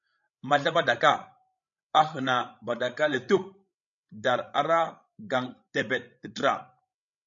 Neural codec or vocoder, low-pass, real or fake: codec, 16 kHz, 16 kbps, FreqCodec, larger model; 7.2 kHz; fake